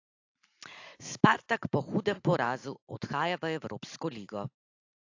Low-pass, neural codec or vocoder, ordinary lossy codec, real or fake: 7.2 kHz; none; AAC, 48 kbps; real